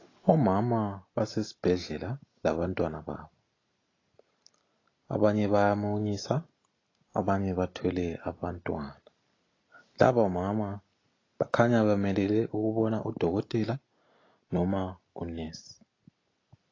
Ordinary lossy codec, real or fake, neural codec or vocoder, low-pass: AAC, 32 kbps; real; none; 7.2 kHz